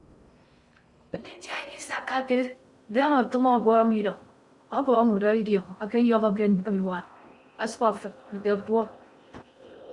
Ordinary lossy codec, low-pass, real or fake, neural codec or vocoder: Opus, 64 kbps; 10.8 kHz; fake; codec, 16 kHz in and 24 kHz out, 0.6 kbps, FocalCodec, streaming, 4096 codes